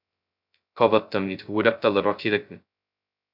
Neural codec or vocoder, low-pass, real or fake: codec, 16 kHz, 0.2 kbps, FocalCodec; 5.4 kHz; fake